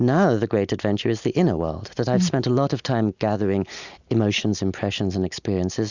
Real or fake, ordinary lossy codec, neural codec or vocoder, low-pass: real; Opus, 64 kbps; none; 7.2 kHz